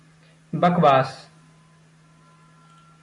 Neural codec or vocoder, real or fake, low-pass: none; real; 10.8 kHz